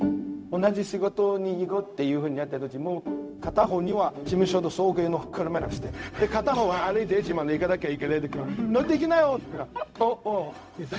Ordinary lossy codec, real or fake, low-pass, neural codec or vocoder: none; fake; none; codec, 16 kHz, 0.4 kbps, LongCat-Audio-Codec